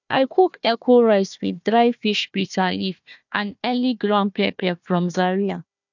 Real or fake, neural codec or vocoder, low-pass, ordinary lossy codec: fake; codec, 16 kHz, 1 kbps, FunCodec, trained on Chinese and English, 50 frames a second; 7.2 kHz; none